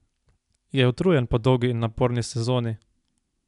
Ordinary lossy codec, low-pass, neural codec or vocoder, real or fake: none; 10.8 kHz; none; real